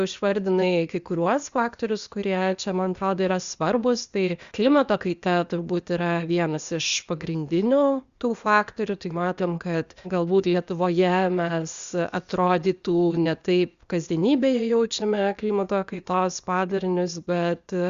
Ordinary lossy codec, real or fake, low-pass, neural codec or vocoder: Opus, 64 kbps; fake; 7.2 kHz; codec, 16 kHz, 0.8 kbps, ZipCodec